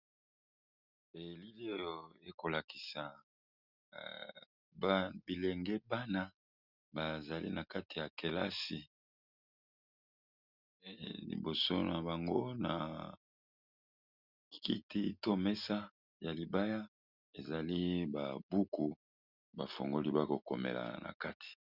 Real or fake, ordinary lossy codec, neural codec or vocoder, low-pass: real; Opus, 64 kbps; none; 5.4 kHz